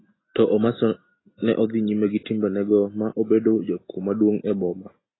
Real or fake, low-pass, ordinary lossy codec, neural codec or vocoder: real; 7.2 kHz; AAC, 16 kbps; none